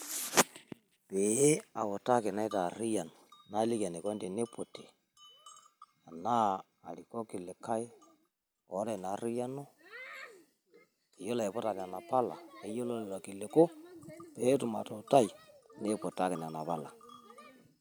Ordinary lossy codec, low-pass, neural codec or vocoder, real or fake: none; none; none; real